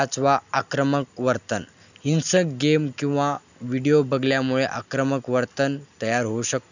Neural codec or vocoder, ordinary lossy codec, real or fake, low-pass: none; none; real; 7.2 kHz